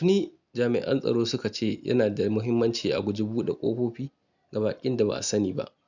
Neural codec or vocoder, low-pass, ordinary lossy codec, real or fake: none; 7.2 kHz; none; real